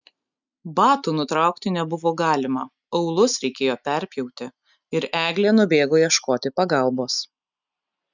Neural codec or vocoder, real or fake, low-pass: none; real; 7.2 kHz